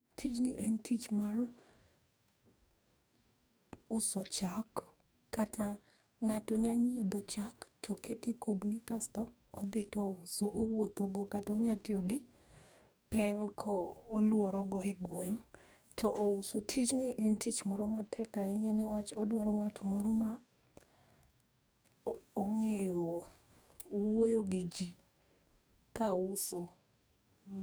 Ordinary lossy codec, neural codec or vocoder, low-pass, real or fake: none; codec, 44.1 kHz, 2.6 kbps, DAC; none; fake